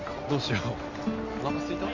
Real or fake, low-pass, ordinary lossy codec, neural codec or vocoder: real; 7.2 kHz; Opus, 64 kbps; none